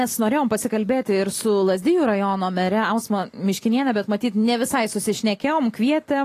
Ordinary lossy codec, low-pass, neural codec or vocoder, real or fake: AAC, 48 kbps; 14.4 kHz; none; real